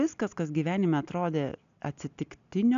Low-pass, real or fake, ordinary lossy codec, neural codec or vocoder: 7.2 kHz; real; AAC, 96 kbps; none